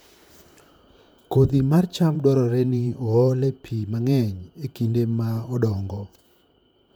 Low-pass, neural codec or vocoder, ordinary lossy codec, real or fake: none; vocoder, 44.1 kHz, 128 mel bands, Pupu-Vocoder; none; fake